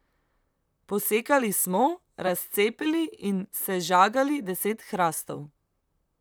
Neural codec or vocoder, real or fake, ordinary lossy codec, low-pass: vocoder, 44.1 kHz, 128 mel bands, Pupu-Vocoder; fake; none; none